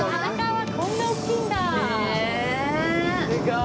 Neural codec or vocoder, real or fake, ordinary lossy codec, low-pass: none; real; none; none